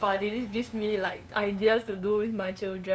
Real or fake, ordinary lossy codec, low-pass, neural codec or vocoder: fake; none; none; codec, 16 kHz, 2 kbps, FunCodec, trained on LibriTTS, 25 frames a second